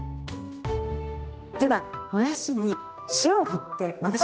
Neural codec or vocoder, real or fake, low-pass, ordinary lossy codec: codec, 16 kHz, 1 kbps, X-Codec, HuBERT features, trained on general audio; fake; none; none